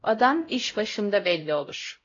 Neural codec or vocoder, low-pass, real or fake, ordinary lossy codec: codec, 16 kHz, 0.5 kbps, X-Codec, HuBERT features, trained on LibriSpeech; 7.2 kHz; fake; AAC, 32 kbps